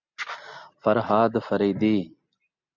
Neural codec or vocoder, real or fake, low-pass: none; real; 7.2 kHz